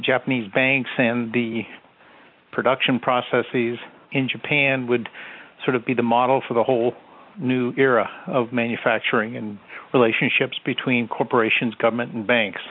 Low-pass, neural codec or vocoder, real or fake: 5.4 kHz; none; real